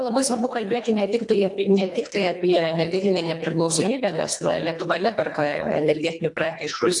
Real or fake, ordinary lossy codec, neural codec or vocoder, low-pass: fake; AAC, 64 kbps; codec, 24 kHz, 1.5 kbps, HILCodec; 10.8 kHz